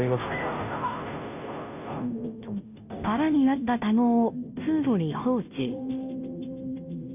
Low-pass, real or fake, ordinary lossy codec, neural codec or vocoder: 3.6 kHz; fake; none; codec, 16 kHz, 0.5 kbps, FunCodec, trained on Chinese and English, 25 frames a second